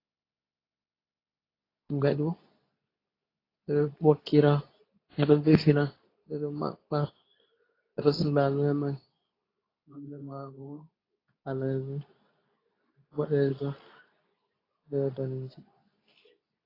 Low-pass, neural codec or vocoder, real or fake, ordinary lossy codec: 5.4 kHz; codec, 24 kHz, 0.9 kbps, WavTokenizer, medium speech release version 2; fake; AAC, 24 kbps